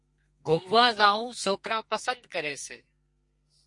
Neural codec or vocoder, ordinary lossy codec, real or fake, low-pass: codec, 32 kHz, 1.9 kbps, SNAC; MP3, 48 kbps; fake; 10.8 kHz